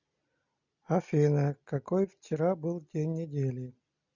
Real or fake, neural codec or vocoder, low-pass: real; none; 7.2 kHz